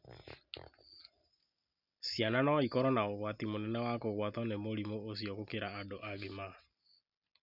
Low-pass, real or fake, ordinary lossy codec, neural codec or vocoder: 5.4 kHz; real; none; none